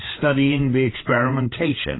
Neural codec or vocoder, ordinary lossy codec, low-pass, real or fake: codec, 16 kHz, 8 kbps, FreqCodec, larger model; AAC, 16 kbps; 7.2 kHz; fake